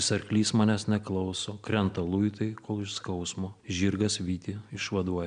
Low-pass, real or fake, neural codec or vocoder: 9.9 kHz; real; none